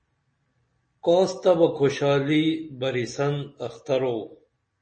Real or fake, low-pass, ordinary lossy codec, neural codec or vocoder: real; 9.9 kHz; MP3, 32 kbps; none